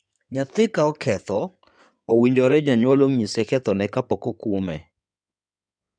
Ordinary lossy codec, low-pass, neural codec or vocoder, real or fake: none; 9.9 kHz; codec, 16 kHz in and 24 kHz out, 2.2 kbps, FireRedTTS-2 codec; fake